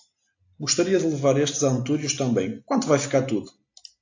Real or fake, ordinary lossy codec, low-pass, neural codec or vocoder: real; AAC, 48 kbps; 7.2 kHz; none